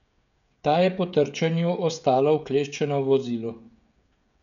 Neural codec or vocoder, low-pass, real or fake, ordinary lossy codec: codec, 16 kHz, 16 kbps, FreqCodec, smaller model; 7.2 kHz; fake; none